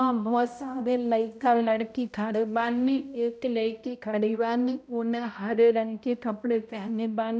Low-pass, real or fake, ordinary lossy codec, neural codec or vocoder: none; fake; none; codec, 16 kHz, 0.5 kbps, X-Codec, HuBERT features, trained on balanced general audio